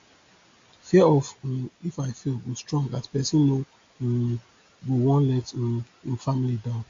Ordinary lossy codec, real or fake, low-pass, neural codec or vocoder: AAC, 48 kbps; real; 7.2 kHz; none